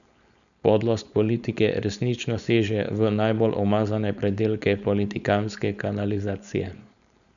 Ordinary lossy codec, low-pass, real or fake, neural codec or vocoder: none; 7.2 kHz; fake; codec, 16 kHz, 4.8 kbps, FACodec